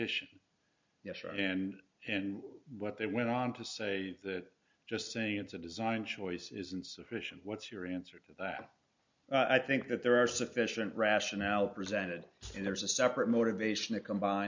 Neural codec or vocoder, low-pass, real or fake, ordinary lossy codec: none; 7.2 kHz; real; MP3, 64 kbps